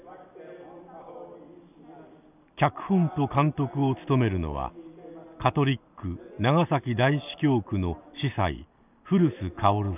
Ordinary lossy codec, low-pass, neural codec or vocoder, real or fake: none; 3.6 kHz; none; real